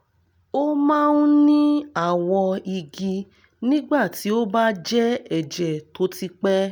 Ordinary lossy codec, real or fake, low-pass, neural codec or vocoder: none; real; 19.8 kHz; none